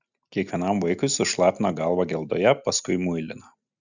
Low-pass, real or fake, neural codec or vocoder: 7.2 kHz; real; none